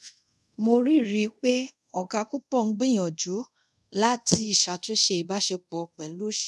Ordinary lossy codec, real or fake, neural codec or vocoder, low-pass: none; fake; codec, 24 kHz, 0.5 kbps, DualCodec; none